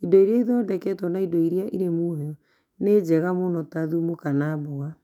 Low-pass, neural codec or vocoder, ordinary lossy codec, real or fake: 19.8 kHz; autoencoder, 48 kHz, 128 numbers a frame, DAC-VAE, trained on Japanese speech; none; fake